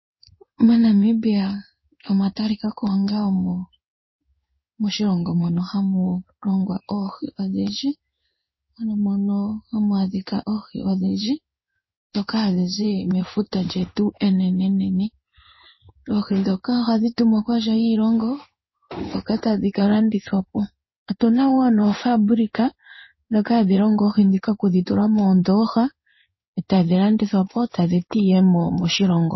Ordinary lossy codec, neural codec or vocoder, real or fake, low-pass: MP3, 24 kbps; codec, 16 kHz in and 24 kHz out, 1 kbps, XY-Tokenizer; fake; 7.2 kHz